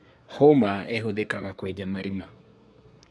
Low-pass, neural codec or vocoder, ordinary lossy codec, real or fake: none; codec, 24 kHz, 1 kbps, SNAC; none; fake